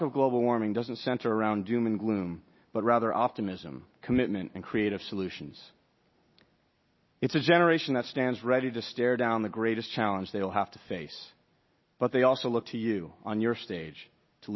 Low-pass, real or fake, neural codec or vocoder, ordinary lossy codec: 7.2 kHz; real; none; MP3, 24 kbps